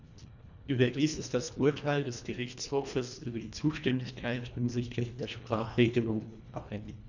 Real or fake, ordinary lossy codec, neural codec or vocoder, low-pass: fake; none; codec, 24 kHz, 1.5 kbps, HILCodec; 7.2 kHz